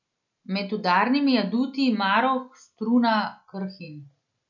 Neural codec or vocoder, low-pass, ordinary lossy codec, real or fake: none; 7.2 kHz; none; real